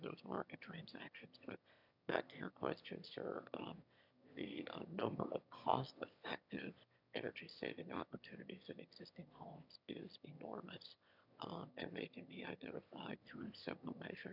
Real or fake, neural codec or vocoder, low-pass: fake; autoencoder, 22.05 kHz, a latent of 192 numbers a frame, VITS, trained on one speaker; 5.4 kHz